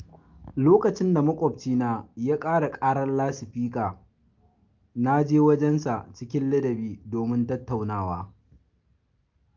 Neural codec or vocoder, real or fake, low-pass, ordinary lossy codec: none; real; 7.2 kHz; Opus, 32 kbps